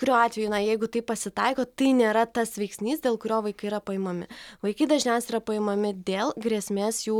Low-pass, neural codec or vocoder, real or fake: 19.8 kHz; none; real